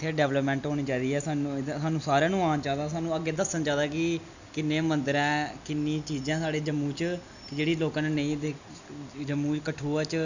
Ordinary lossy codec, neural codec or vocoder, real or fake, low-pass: none; none; real; 7.2 kHz